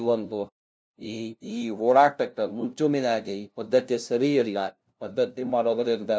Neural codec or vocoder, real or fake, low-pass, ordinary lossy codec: codec, 16 kHz, 0.5 kbps, FunCodec, trained on LibriTTS, 25 frames a second; fake; none; none